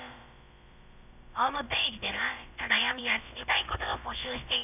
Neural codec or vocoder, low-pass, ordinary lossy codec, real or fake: codec, 16 kHz, about 1 kbps, DyCAST, with the encoder's durations; 3.6 kHz; none; fake